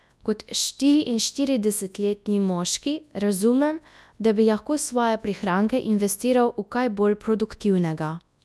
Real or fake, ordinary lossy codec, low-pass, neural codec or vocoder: fake; none; none; codec, 24 kHz, 0.9 kbps, WavTokenizer, large speech release